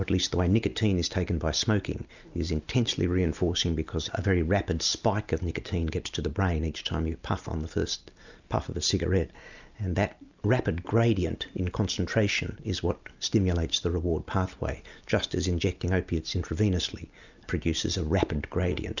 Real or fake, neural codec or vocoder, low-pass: real; none; 7.2 kHz